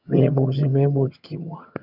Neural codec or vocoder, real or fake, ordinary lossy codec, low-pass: vocoder, 22.05 kHz, 80 mel bands, HiFi-GAN; fake; none; 5.4 kHz